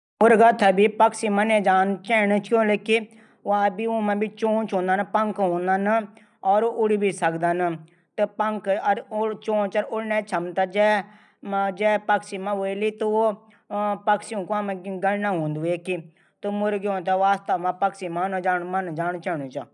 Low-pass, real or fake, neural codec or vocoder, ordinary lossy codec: 10.8 kHz; real; none; none